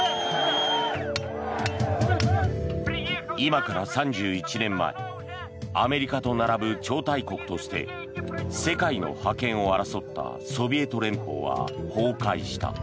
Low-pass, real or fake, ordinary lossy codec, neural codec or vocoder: none; real; none; none